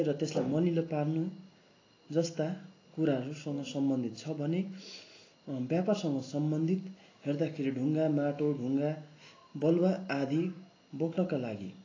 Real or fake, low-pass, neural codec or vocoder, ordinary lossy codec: real; 7.2 kHz; none; AAC, 32 kbps